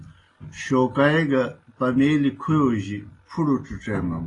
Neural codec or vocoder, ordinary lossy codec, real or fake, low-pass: none; AAC, 32 kbps; real; 10.8 kHz